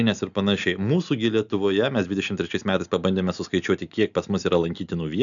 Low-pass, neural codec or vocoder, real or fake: 7.2 kHz; none; real